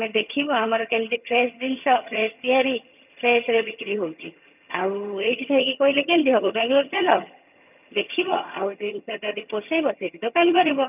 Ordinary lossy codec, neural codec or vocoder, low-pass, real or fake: none; vocoder, 22.05 kHz, 80 mel bands, HiFi-GAN; 3.6 kHz; fake